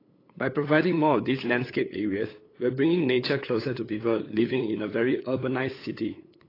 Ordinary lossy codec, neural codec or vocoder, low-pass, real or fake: AAC, 24 kbps; codec, 16 kHz, 8 kbps, FunCodec, trained on LibriTTS, 25 frames a second; 5.4 kHz; fake